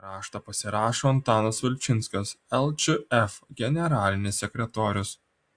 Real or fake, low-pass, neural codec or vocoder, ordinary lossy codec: real; 9.9 kHz; none; AAC, 64 kbps